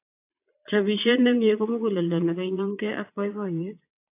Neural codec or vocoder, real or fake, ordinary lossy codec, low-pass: vocoder, 22.05 kHz, 80 mel bands, Vocos; fake; AAC, 24 kbps; 3.6 kHz